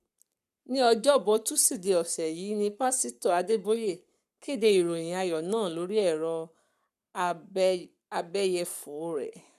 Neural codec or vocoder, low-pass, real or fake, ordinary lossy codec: codec, 44.1 kHz, 7.8 kbps, Pupu-Codec; 14.4 kHz; fake; none